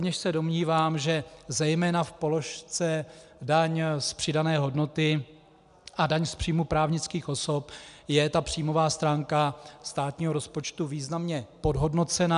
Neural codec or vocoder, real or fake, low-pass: none; real; 10.8 kHz